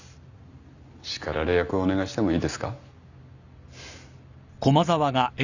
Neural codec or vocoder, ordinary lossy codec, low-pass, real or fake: none; none; 7.2 kHz; real